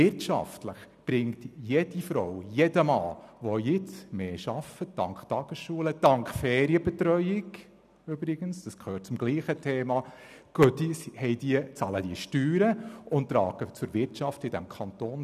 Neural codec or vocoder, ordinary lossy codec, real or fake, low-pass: none; none; real; 14.4 kHz